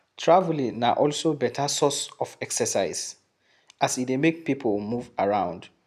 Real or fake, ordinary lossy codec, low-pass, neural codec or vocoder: real; none; 14.4 kHz; none